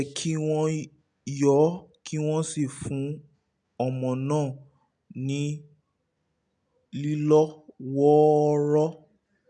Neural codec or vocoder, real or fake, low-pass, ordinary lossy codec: none; real; 9.9 kHz; none